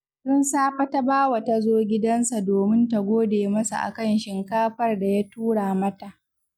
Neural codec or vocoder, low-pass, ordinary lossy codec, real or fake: none; 19.8 kHz; none; real